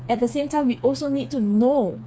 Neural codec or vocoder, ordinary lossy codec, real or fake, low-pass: codec, 16 kHz, 4 kbps, FreqCodec, smaller model; none; fake; none